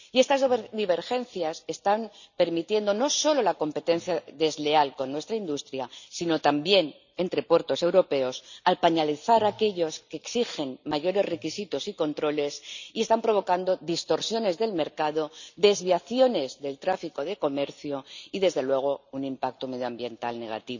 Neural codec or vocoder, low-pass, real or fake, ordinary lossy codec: none; 7.2 kHz; real; none